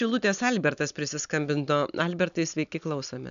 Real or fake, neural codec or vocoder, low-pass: real; none; 7.2 kHz